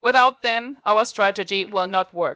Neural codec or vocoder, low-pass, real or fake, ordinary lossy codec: codec, 16 kHz, 0.7 kbps, FocalCodec; none; fake; none